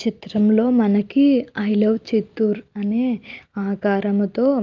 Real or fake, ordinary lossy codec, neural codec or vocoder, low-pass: real; Opus, 24 kbps; none; 7.2 kHz